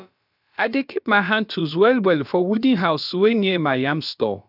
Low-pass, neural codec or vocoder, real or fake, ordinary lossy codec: 5.4 kHz; codec, 16 kHz, about 1 kbps, DyCAST, with the encoder's durations; fake; none